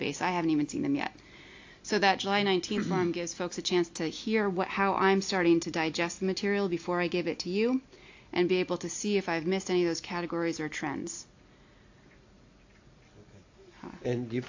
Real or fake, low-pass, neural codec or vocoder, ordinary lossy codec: real; 7.2 kHz; none; AAC, 48 kbps